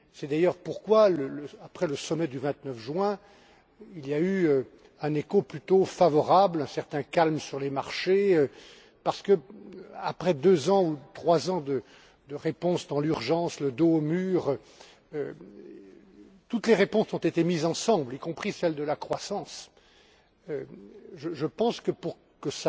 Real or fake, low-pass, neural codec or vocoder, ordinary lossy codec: real; none; none; none